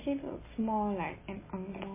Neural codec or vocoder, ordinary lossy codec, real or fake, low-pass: none; MP3, 16 kbps; real; 3.6 kHz